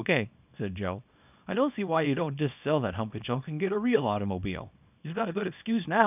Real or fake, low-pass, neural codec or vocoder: fake; 3.6 kHz; codec, 24 kHz, 0.9 kbps, WavTokenizer, small release